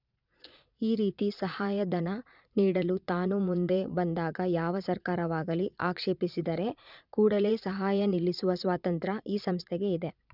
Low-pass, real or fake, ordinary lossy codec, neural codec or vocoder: 5.4 kHz; fake; none; vocoder, 22.05 kHz, 80 mel bands, Vocos